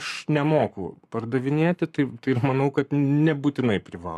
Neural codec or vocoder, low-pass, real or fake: codec, 44.1 kHz, 7.8 kbps, Pupu-Codec; 14.4 kHz; fake